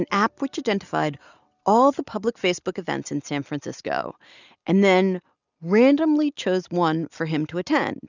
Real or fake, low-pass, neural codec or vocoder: real; 7.2 kHz; none